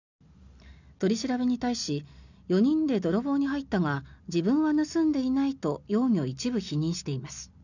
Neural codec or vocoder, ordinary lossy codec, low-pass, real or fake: none; none; 7.2 kHz; real